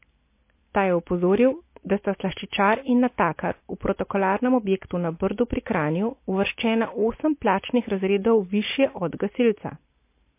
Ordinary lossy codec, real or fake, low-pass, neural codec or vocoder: MP3, 24 kbps; real; 3.6 kHz; none